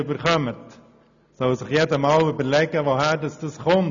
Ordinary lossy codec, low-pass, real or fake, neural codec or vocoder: none; 7.2 kHz; real; none